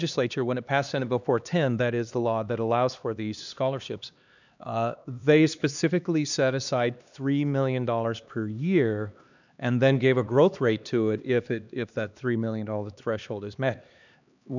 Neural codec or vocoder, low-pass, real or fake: codec, 16 kHz, 2 kbps, X-Codec, HuBERT features, trained on LibriSpeech; 7.2 kHz; fake